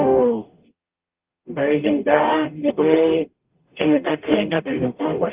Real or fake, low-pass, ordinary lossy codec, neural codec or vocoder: fake; 3.6 kHz; Opus, 24 kbps; codec, 44.1 kHz, 0.9 kbps, DAC